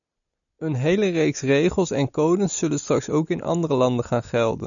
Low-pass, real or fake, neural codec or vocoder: 7.2 kHz; real; none